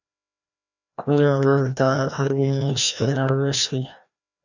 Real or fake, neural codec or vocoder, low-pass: fake; codec, 16 kHz, 1 kbps, FreqCodec, larger model; 7.2 kHz